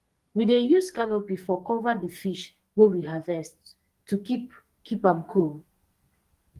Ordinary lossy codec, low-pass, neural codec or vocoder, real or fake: Opus, 24 kbps; 14.4 kHz; codec, 44.1 kHz, 2.6 kbps, SNAC; fake